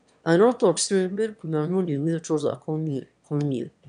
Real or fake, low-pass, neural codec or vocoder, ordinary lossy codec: fake; 9.9 kHz; autoencoder, 22.05 kHz, a latent of 192 numbers a frame, VITS, trained on one speaker; none